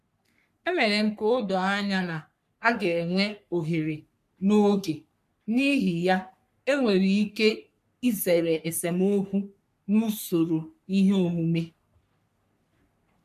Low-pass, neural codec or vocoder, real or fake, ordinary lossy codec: 14.4 kHz; codec, 44.1 kHz, 3.4 kbps, Pupu-Codec; fake; MP3, 96 kbps